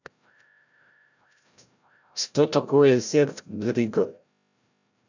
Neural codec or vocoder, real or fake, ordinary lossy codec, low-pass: codec, 16 kHz, 0.5 kbps, FreqCodec, larger model; fake; none; 7.2 kHz